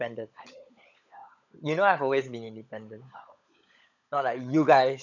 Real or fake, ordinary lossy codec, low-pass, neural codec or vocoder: fake; none; 7.2 kHz; codec, 16 kHz, 16 kbps, FunCodec, trained on LibriTTS, 50 frames a second